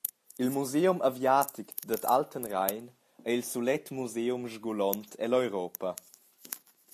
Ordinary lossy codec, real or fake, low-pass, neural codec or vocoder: MP3, 64 kbps; real; 14.4 kHz; none